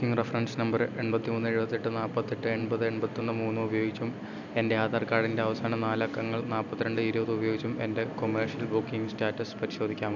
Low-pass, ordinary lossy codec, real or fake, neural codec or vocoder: 7.2 kHz; none; real; none